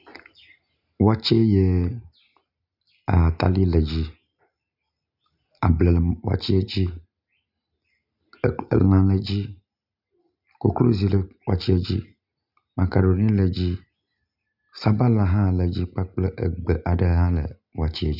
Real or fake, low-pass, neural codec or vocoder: real; 5.4 kHz; none